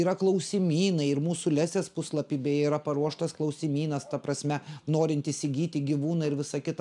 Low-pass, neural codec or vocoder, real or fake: 10.8 kHz; none; real